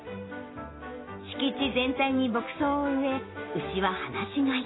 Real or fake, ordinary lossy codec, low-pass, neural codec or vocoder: real; AAC, 16 kbps; 7.2 kHz; none